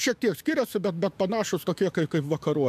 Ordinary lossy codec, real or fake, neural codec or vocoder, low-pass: MP3, 96 kbps; fake; autoencoder, 48 kHz, 128 numbers a frame, DAC-VAE, trained on Japanese speech; 14.4 kHz